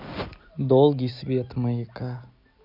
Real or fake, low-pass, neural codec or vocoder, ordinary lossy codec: real; 5.4 kHz; none; none